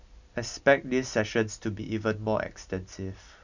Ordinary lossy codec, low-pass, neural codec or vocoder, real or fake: none; 7.2 kHz; none; real